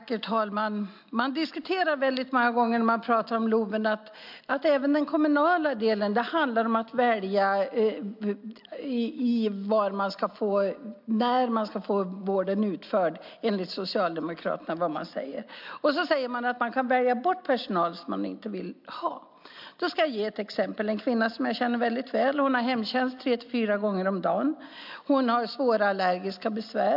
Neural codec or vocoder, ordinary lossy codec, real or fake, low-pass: none; MP3, 48 kbps; real; 5.4 kHz